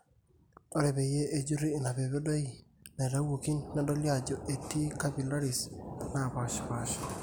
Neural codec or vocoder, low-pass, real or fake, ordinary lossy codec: none; none; real; none